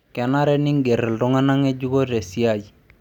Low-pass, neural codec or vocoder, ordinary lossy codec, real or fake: 19.8 kHz; none; none; real